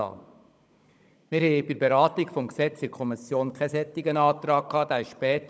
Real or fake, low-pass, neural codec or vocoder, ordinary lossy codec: fake; none; codec, 16 kHz, 16 kbps, FunCodec, trained on Chinese and English, 50 frames a second; none